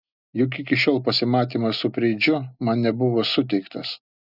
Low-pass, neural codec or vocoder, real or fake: 5.4 kHz; none; real